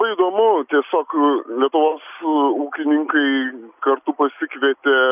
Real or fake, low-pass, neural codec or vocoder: real; 3.6 kHz; none